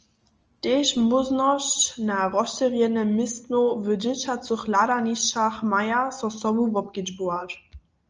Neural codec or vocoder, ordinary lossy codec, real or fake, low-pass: none; Opus, 24 kbps; real; 7.2 kHz